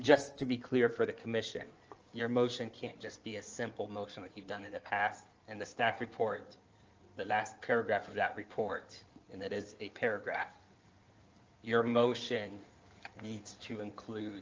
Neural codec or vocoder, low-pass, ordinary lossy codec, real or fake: codec, 16 kHz in and 24 kHz out, 2.2 kbps, FireRedTTS-2 codec; 7.2 kHz; Opus, 24 kbps; fake